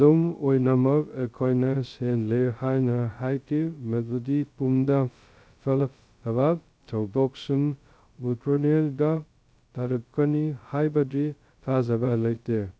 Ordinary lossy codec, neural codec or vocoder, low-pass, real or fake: none; codec, 16 kHz, 0.2 kbps, FocalCodec; none; fake